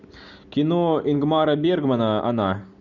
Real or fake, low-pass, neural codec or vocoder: real; 7.2 kHz; none